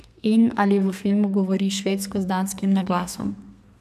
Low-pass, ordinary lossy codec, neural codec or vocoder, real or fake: 14.4 kHz; none; codec, 32 kHz, 1.9 kbps, SNAC; fake